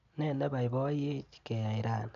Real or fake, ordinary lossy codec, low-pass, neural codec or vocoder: real; none; 7.2 kHz; none